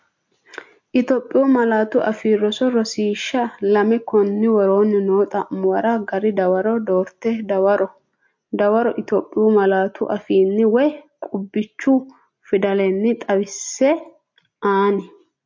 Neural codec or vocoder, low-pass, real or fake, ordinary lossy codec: none; 7.2 kHz; real; MP3, 48 kbps